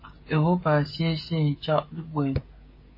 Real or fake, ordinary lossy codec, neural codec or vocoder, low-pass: fake; MP3, 24 kbps; codec, 16 kHz, 16 kbps, FreqCodec, smaller model; 5.4 kHz